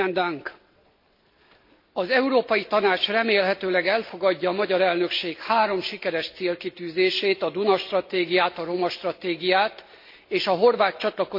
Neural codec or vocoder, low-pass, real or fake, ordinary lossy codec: none; 5.4 kHz; real; MP3, 32 kbps